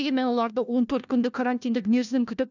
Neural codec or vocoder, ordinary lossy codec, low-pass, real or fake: codec, 16 kHz, 1 kbps, FunCodec, trained on LibriTTS, 50 frames a second; none; 7.2 kHz; fake